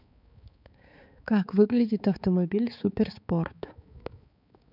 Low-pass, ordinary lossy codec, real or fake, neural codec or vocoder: 5.4 kHz; none; fake; codec, 16 kHz, 4 kbps, X-Codec, HuBERT features, trained on balanced general audio